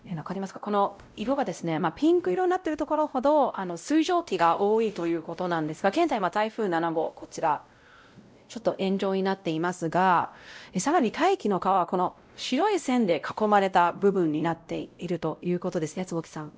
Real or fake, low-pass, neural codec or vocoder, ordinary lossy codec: fake; none; codec, 16 kHz, 0.5 kbps, X-Codec, WavLM features, trained on Multilingual LibriSpeech; none